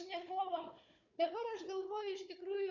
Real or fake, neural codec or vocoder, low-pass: fake; codec, 16 kHz, 4 kbps, FunCodec, trained on Chinese and English, 50 frames a second; 7.2 kHz